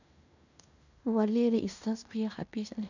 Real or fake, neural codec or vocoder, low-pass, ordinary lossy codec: fake; codec, 16 kHz in and 24 kHz out, 0.9 kbps, LongCat-Audio-Codec, fine tuned four codebook decoder; 7.2 kHz; none